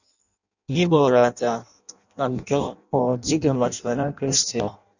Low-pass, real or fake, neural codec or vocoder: 7.2 kHz; fake; codec, 16 kHz in and 24 kHz out, 0.6 kbps, FireRedTTS-2 codec